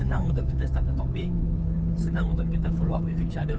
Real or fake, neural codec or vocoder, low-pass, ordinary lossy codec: fake; codec, 16 kHz, 2 kbps, FunCodec, trained on Chinese and English, 25 frames a second; none; none